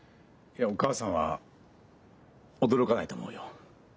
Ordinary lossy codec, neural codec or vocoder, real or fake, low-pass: none; none; real; none